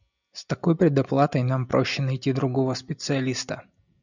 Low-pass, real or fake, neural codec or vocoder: 7.2 kHz; real; none